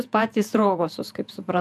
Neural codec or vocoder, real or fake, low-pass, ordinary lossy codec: vocoder, 48 kHz, 128 mel bands, Vocos; fake; 14.4 kHz; AAC, 96 kbps